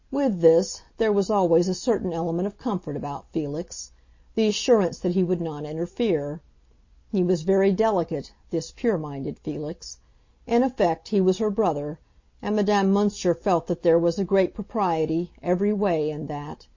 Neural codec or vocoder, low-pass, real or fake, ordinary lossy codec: none; 7.2 kHz; real; MP3, 32 kbps